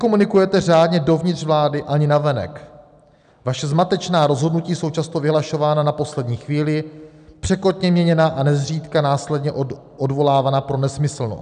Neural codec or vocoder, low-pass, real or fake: none; 9.9 kHz; real